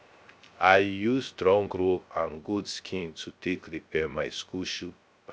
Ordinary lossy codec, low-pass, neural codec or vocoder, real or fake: none; none; codec, 16 kHz, 0.3 kbps, FocalCodec; fake